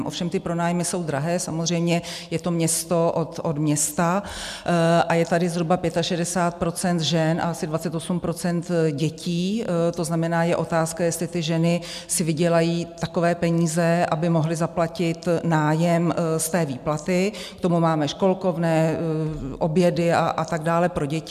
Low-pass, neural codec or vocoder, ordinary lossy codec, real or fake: 14.4 kHz; none; MP3, 96 kbps; real